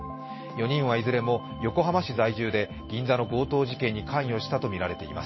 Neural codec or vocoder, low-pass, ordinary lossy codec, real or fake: none; 7.2 kHz; MP3, 24 kbps; real